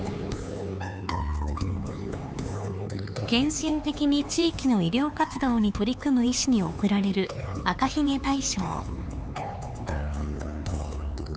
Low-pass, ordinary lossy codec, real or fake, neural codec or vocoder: none; none; fake; codec, 16 kHz, 4 kbps, X-Codec, HuBERT features, trained on LibriSpeech